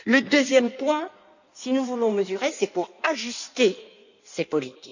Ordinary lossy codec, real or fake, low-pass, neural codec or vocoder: none; fake; 7.2 kHz; codec, 16 kHz in and 24 kHz out, 1.1 kbps, FireRedTTS-2 codec